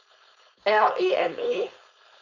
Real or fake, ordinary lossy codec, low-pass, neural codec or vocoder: fake; none; 7.2 kHz; codec, 16 kHz, 4.8 kbps, FACodec